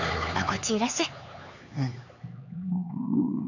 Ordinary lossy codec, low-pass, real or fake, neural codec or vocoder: none; 7.2 kHz; fake; codec, 16 kHz, 4 kbps, X-Codec, HuBERT features, trained on LibriSpeech